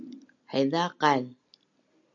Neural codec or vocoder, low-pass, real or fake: none; 7.2 kHz; real